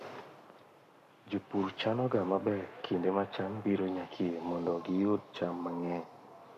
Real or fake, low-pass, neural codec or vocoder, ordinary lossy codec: fake; 14.4 kHz; codec, 44.1 kHz, 7.8 kbps, Pupu-Codec; none